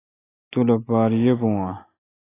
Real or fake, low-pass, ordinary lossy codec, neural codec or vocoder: real; 3.6 kHz; AAC, 16 kbps; none